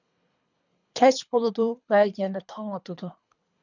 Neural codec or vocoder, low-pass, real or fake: codec, 24 kHz, 3 kbps, HILCodec; 7.2 kHz; fake